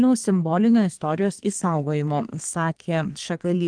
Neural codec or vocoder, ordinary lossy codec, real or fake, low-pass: codec, 32 kHz, 1.9 kbps, SNAC; Opus, 24 kbps; fake; 9.9 kHz